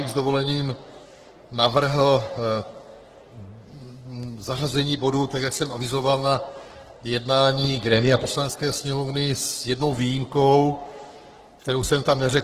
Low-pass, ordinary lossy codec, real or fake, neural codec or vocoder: 14.4 kHz; Opus, 16 kbps; fake; codec, 44.1 kHz, 3.4 kbps, Pupu-Codec